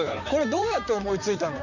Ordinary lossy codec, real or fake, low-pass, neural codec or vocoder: none; fake; 7.2 kHz; vocoder, 44.1 kHz, 128 mel bands, Pupu-Vocoder